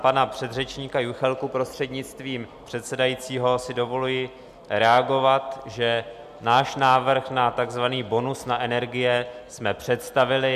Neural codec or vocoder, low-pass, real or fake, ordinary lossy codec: none; 14.4 kHz; real; MP3, 96 kbps